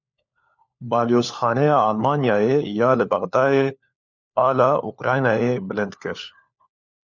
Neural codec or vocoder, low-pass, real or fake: codec, 16 kHz, 4 kbps, FunCodec, trained on LibriTTS, 50 frames a second; 7.2 kHz; fake